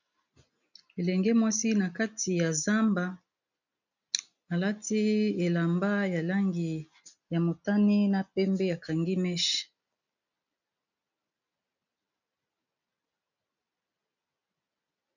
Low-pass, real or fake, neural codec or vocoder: 7.2 kHz; real; none